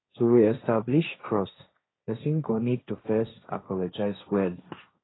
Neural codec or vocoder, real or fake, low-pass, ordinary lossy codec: codec, 16 kHz, 1.1 kbps, Voila-Tokenizer; fake; 7.2 kHz; AAC, 16 kbps